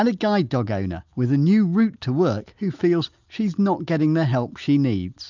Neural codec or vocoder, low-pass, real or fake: none; 7.2 kHz; real